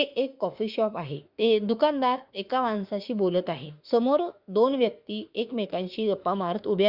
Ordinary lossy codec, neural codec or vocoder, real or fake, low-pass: Opus, 64 kbps; autoencoder, 48 kHz, 32 numbers a frame, DAC-VAE, trained on Japanese speech; fake; 5.4 kHz